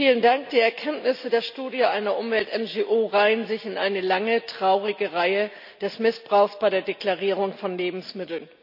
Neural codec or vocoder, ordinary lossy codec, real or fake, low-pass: none; none; real; 5.4 kHz